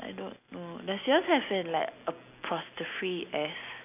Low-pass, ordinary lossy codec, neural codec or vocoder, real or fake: 3.6 kHz; AAC, 32 kbps; none; real